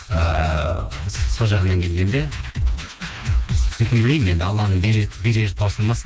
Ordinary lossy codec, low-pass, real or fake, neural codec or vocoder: none; none; fake; codec, 16 kHz, 2 kbps, FreqCodec, smaller model